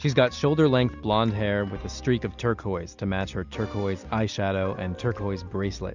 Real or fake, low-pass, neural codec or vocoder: real; 7.2 kHz; none